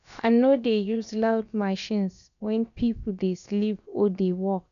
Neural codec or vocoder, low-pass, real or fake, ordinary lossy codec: codec, 16 kHz, about 1 kbps, DyCAST, with the encoder's durations; 7.2 kHz; fake; none